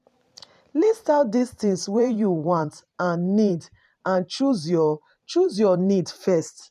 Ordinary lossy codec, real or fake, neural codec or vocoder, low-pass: none; fake; vocoder, 44.1 kHz, 128 mel bands every 512 samples, BigVGAN v2; 14.4 kHz